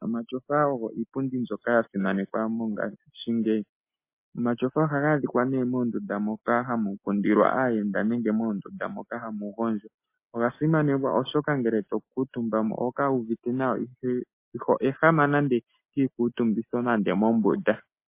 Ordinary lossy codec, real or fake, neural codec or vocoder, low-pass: MP3, 24 kbps; real; none; 3.6 kHz